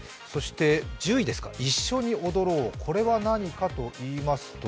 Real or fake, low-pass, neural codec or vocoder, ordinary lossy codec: real; none; none; none